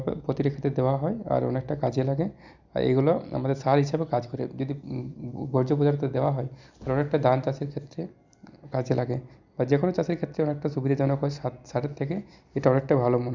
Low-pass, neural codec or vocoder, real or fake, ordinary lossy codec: 7.2 kHz; none; real; Opus, 64 kbps